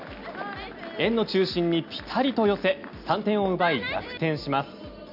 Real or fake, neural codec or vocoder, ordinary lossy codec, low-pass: real; none; none; 5.4 kHz